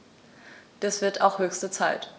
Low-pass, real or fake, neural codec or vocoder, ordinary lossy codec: none; real; none; none